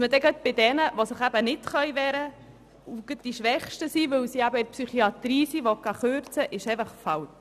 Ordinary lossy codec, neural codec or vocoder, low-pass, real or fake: none; none; 14.4 kHz; real